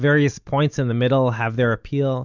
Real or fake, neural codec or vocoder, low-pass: real; none; 7.2 kHz